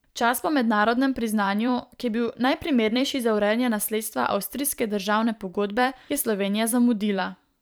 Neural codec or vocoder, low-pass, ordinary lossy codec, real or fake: vocoder, 44.1 kHz, 128 mel bands every 512 samples, BigVGAN v2; none; none; fake